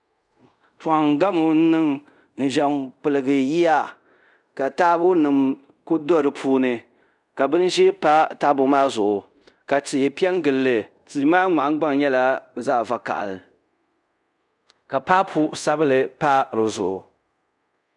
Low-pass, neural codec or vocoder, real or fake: 10.8 kHz; codec, 24 kHz, 0.5 kbps, DualCodec; fake